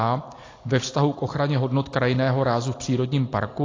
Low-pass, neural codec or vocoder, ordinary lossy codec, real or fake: 7.2 kHz; none; AAC, 32 kbps; real